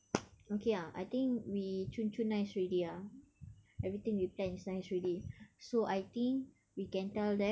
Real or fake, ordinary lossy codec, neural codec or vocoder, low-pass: real; none; none; none